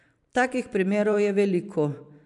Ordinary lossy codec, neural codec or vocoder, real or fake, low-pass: MP3, 96 kbps; vocoder, 44.1 kHz, 128 mel bands every 512 samples, BigVGAN v2; fake; 10.8 kHz